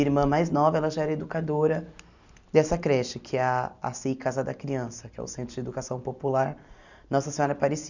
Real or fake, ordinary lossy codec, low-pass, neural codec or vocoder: real; none; 7.2 kHz; none